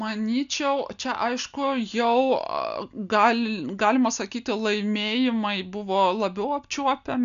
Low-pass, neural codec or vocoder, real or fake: 7.2 kHz; none; real